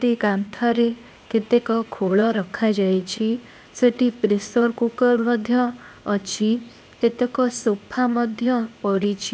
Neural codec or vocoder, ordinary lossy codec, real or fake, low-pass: codec, 16 kHz, 0.8 kbps, ZipCodec; none; fake; none